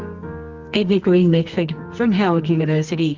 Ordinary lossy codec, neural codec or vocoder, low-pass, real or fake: Opus, 32 kbps; codec, 24 kHz, 0.9 kbps, WavTokenizer, medium music audio release; 7.2 kHz; fake